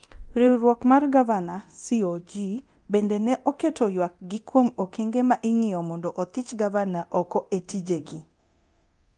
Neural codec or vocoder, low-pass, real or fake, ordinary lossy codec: codec, 24 kHz, 0.9 kbps, DualCodec; 10.8 kHz; fake; Opus, 32 kbps